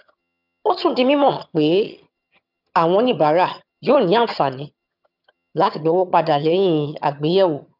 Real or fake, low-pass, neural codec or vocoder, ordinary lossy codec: fake; 5.4 kHz; vocoder, 22.05 kHz, 80 mel bands, HiFi-GAN; none